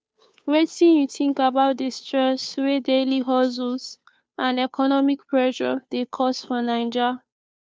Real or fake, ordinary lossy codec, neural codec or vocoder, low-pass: fake; none; codec, 16 kHz, 2 kbps, FunCodec, trained on Chinese and English, 25 frames a second; none